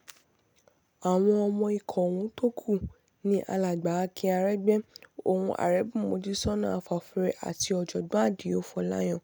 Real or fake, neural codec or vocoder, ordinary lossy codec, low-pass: real; none; none; none